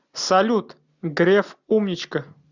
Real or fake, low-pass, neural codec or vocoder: real; 7.2 kHz; none